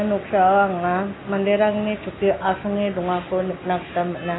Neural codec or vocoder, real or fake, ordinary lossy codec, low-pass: none; real; AAC, 16 kbps; 7.2 kHz